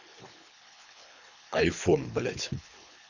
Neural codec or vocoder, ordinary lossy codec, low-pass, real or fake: codec, 24 kHz, 3 kbps, HILCodec; none; 7.2 kHz; fake